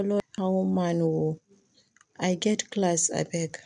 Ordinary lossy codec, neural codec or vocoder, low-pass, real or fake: none; none; 9.9 kHz; real